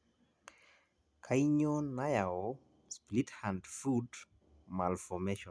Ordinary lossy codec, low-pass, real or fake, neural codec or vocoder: none; none; real; none